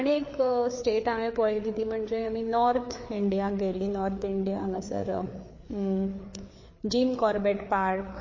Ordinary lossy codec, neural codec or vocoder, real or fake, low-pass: MP3, 32 kbps; codec, 16 kHz, 4 kbps, FreqCodec, larger model; fake; 7.2 kHz